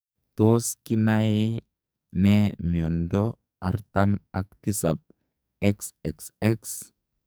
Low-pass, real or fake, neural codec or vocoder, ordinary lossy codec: none; fake; codec, 44.1 kHz, 2.6 kbps, SNAC; none